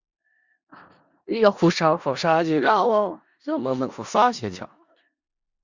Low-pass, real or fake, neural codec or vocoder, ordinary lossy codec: 7.2 kHz; fake; codec, 16 kHz in and 24 kHz out, 0.4 kbps, LongCat-Audio-Codec, four codebook decoder; Opus, 64 kbps